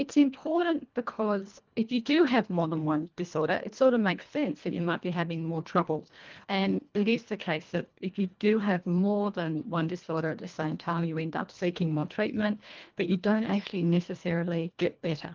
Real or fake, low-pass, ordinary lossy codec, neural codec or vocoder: fake; 7.2 kHz; Opus, 32 kbps; codec, 24 kHz, 1.5 kbps, HILCodec